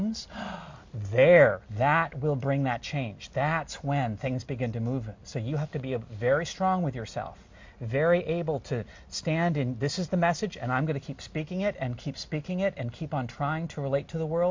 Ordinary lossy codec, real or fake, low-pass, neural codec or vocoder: MP3, 48 kbps; real; 7.2 kHz; none